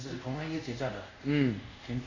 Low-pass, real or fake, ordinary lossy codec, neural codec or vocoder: 7.2 kHz; fake; AAC, 48 kbps; codec, 24 kHz, 0.5 kbps, DualCodec